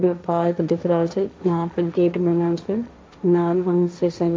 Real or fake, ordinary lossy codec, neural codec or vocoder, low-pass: fake; none; codec, 16 kHz, 1.1 kbps, Voila-Tokenizer; none